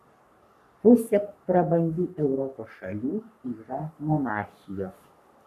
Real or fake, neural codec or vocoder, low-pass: fake; codec, 44.1 kHz, 3.4 kbps, Pupu-Codec; 14.4 kHz